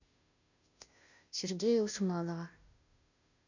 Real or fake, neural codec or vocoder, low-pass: fake; codec, 16 kHz, 0.5 kbps, FunCodec, trained on Chinese and English, 25 frames a second; 7.2 kHz